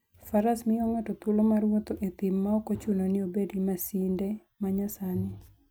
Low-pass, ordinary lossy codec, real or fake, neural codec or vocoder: none; none; real; none